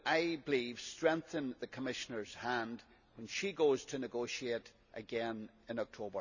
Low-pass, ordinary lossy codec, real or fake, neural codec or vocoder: 7.2 kHz; none; real; none